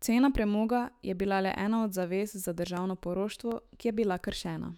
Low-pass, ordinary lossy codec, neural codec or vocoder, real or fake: 19.8 kHz; none; autoencoder, 48 kHz, 128 numbers a frame, DAC-VAE, trained on Japanese speech; fake